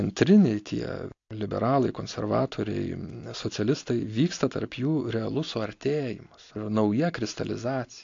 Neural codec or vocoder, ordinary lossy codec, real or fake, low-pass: none; AAC, 48 kbps; real; 7.2 kHz